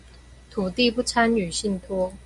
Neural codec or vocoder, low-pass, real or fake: none; 10.8 kHz; real